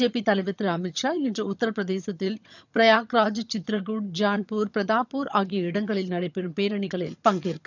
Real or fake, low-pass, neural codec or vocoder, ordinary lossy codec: fake; 7.2 kHz; vocoder, 22.05 kHz, 80 mel bands, HiFi-GAN; none